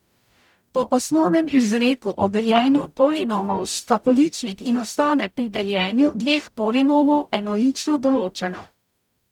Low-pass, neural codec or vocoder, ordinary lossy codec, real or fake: 19.8 kHz; codec, 44.1 kHz, 0.9 kbps, DAC; none; fake